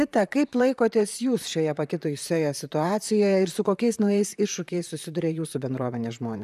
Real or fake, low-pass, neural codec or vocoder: fake; 14.4 kHz; vocoder, 44.1 kHz, 128 mel bands, Pupu-Vocoder